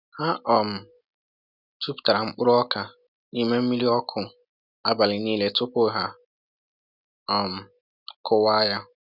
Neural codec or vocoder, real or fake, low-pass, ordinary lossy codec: none; real; 5.4 kHz; none